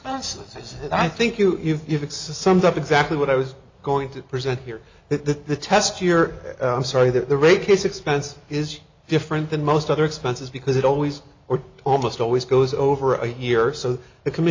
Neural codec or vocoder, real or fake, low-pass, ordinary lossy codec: none; real; 7.2 kHz; MP3, 64 kbps